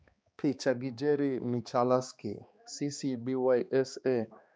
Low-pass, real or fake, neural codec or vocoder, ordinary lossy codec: none; fake; codec, 16 kHz, 2 kbps, X-Codec, HuBERT features, trained on balanced general audio; none